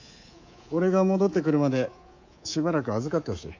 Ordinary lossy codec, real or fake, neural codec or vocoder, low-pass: AAC, 48 kbps; fake; codec, 24 kHz, 3.1 kbps, DualCodec; 7.2 kHz